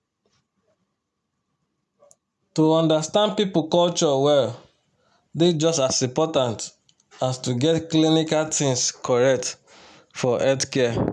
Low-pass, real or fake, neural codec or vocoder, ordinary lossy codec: none; real; none; none